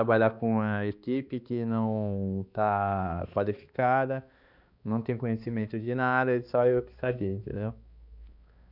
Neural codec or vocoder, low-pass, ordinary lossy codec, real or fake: codec, 16 kHz, 2 kbps, X-Codec, HuBERT features, trained on balanced general audio; 5.4 kHz; Opus, 64 kbps; fake